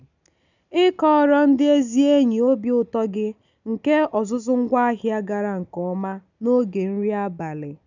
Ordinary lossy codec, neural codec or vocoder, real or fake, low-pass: none; none; real; 7.2 kHz